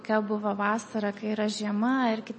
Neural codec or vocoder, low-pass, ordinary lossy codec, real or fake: none; 10.8 kHz; MP3, 32 kbps; real